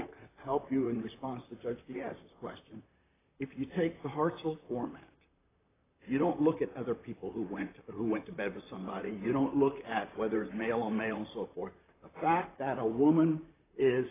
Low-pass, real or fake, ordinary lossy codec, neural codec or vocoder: 3.6 kHz; fake; AAC, 16 kbps; vocoder, 44.1 kHz, 128 mel bands, Pupu-Vocoder